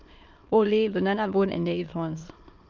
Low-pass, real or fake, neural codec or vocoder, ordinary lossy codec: 7.2 kHz; fake; autoencoder, 22.05 kHz, a latent of 192 numbers a frame, VITS, trained on many speakers; Opus, 24 kbps